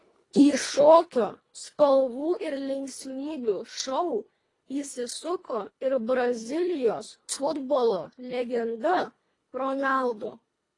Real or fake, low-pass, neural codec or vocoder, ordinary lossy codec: fake; 10.8 kHz; codec, 24 kHz, 1.5 kbps, HILCodec; AAC, 32 kbps